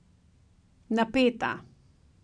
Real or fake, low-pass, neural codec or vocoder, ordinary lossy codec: real; 9.9 kHz; none; none